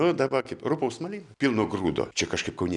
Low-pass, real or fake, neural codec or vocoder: 10.8 kHz; real; none